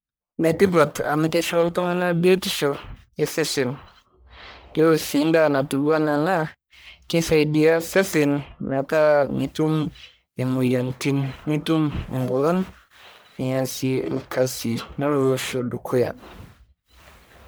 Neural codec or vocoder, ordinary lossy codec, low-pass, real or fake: codec, 44.1 kHz, 1.7 kbps, Pupu-Codec; none; none; fake